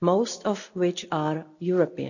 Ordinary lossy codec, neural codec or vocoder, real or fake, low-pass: none; none; real; 7.2 kHz